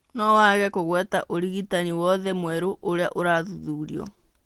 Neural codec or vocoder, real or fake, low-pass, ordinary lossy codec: none; real; 19.8 kHz; Opus, 16 kbps